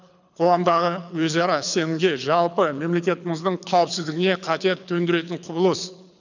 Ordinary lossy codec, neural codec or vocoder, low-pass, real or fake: none; codec, 24 kHz, 6 kbps, HILCodec; 7.2 kHz; fake